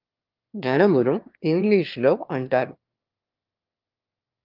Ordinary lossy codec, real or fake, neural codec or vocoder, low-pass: Opus, 32 kbps; fake; autoencoder, 22.05 kHz, a latent of 192 numbers a frame, VITS, trained on one speaker; 5.4 kHz